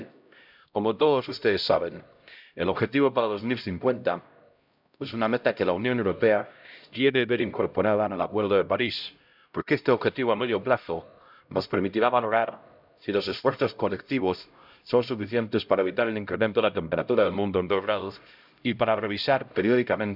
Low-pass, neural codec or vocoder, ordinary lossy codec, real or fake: 5.4 kHz; codec, 16 kHz, 0.5 kbps, X-Codec, HuBERT features, trained on LibriSpeech; none; fake